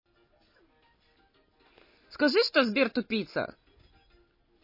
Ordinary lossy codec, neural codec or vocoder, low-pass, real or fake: MP3, 24 kbps; none; 5.4 kHz; real